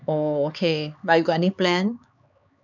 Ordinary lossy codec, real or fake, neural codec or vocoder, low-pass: none; fake; codec, 16 kHz, 4 kbps, X-Codec, HuBERT features, trained on LibriSpeech; 7.2 kHz